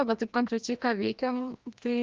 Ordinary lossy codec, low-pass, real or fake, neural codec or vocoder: Opus, 24 kbps; 7.2 kHz; fake; codec, 16 kHz, 1 kbps, FreqCodec, larger model